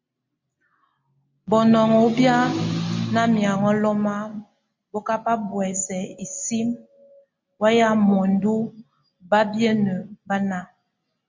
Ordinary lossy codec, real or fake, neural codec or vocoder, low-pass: MP3, 48 kbps; real; none; 7.2 kHz